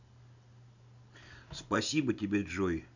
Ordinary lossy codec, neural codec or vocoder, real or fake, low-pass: none; none; real; 7.2 kHz